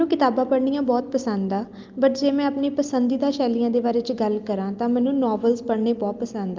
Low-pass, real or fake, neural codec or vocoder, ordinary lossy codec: 7.2 kHz; real; none; Opus, 24 kbps